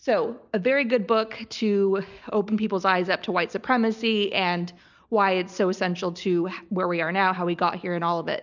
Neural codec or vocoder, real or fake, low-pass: none; real; 7.2 kHz